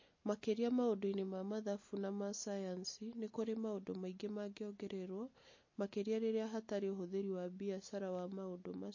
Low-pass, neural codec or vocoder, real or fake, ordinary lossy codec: 7.2 kHz; none; real; MP3, 32 kbps